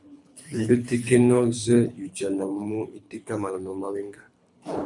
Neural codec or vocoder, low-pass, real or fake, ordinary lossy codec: codec, 24 kHz, 3 kbps, HILCodec; 10.8 kHz; fake; MP3, 96 kbps